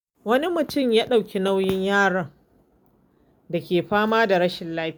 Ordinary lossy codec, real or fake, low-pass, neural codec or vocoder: none; real; none; none